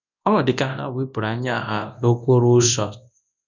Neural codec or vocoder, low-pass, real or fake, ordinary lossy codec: codec, 24 kHz, 0.9 kbps, WavTokenizer, large speech release; 7.2 kHz; fake; none